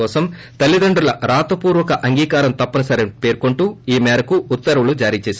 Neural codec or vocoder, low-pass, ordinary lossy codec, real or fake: none; none; none; real